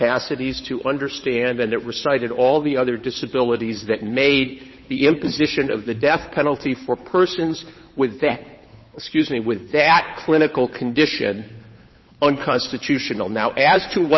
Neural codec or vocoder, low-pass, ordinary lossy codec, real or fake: codec, 16 kHz, 8 kbps, FunCodec, trained on Chinese and English, 25 frames a second; 7.2 kHz; MP3, 24 kbps; fake